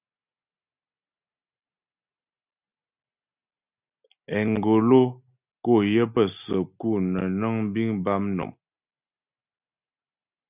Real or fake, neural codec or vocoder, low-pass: real; none; 3.6 kHz